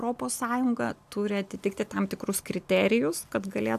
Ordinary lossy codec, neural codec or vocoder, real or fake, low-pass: AAC, 96 kbps; none; real; 14.4 kHz